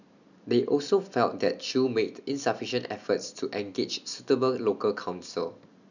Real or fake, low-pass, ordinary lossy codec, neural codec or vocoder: real; 7.2 kHz; none; none